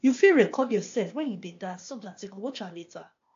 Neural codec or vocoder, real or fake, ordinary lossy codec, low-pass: codec, 16 kHz, 0.8 kbps, ZipCodec; fake; AAC, 48 kbps; 7.2 kHz